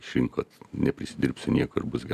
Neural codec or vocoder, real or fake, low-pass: none; real; 14.4 kHz